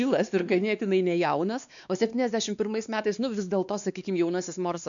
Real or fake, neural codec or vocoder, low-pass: fake; codec, 16 kHz, 2 kbps, X-Codec, WavLM features, trained on Multilingual LibriSpeech; 7.2 kHz